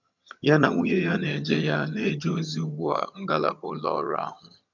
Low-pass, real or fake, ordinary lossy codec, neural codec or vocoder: 7.2 kHz; fake; none; vocoder, 22.05 kHz, 80 mel bands, HiFi-GAN